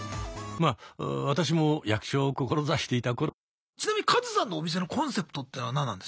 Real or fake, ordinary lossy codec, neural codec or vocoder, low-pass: real; none; none; none